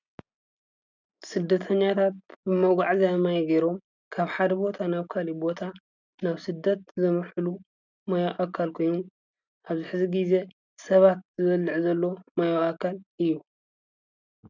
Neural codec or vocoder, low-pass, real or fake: none; 7.2 kHz; real